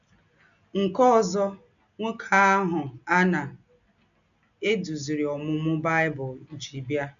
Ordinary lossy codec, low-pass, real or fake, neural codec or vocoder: none; 7.2 kHz; real; none